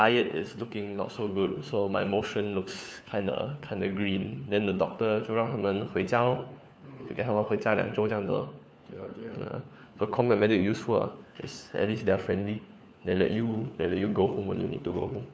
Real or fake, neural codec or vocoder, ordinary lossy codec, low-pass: fake; codec, 16 kHz, 4 kbps, FunCodec, trained on LibriTTS, 50 frames a second; none; none